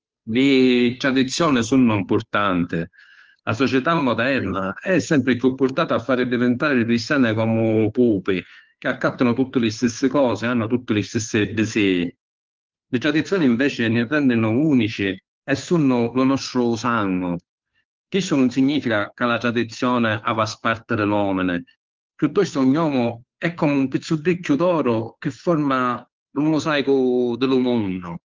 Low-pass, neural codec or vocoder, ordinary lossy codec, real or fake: 7.2 kHz; codec, 16 kHz, 2 kbps, FunCodec, trained on Chinese and English, 25 frames a second; Opus, 24 kbps; fake